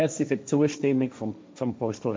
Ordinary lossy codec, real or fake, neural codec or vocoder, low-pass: none; fake; codec, 16 kHz, 1.1 kbps, Voila-Tokenizer; none